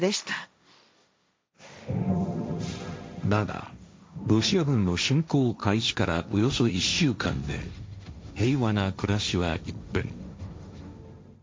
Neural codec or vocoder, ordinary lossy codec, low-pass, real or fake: codec, 16 kHz, 1.1 kbps, Voila-Tokenizer; none; none; fake